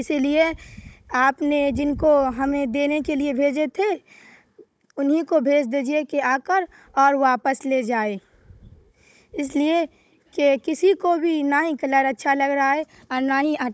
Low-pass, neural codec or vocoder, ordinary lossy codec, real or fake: none; codec, 16 kHz, 16 kbps, FunCodec, trained on Chinese and English, 50 frames a second; none; fake